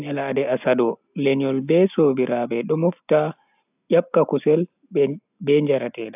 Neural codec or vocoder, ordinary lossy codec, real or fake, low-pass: none; AAC, 32 kbps; real; 3.6 kHz